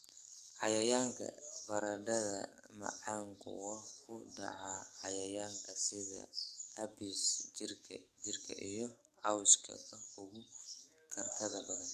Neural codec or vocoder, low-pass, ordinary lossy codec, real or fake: codec, 44.1 kHz, 7.8 kbps, DAC; 14.4 kHz; none; fake